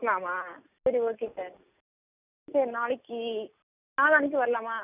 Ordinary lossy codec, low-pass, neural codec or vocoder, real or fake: none; 3.6 kHz; none; real